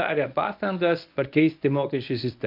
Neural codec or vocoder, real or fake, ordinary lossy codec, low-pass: codec, 24 kHz, 0.9 kbps, WavTokenizer, medium speech release version 1; fake; MP3, 48 kbps; 5.4 kHz